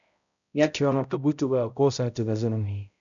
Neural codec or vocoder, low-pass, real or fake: codec, 16 kHz, 0.5 kbps, X-Codec, HuBERT features, trained on balanced general audio; 7.2 kHz; fake